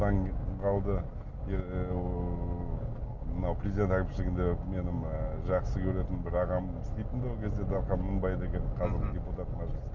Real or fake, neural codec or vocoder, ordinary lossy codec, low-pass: real; none; none; 7.2 kHz